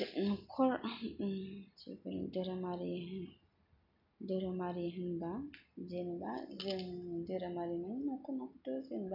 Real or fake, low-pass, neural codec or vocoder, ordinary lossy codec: real; 5.4 kHz; none; none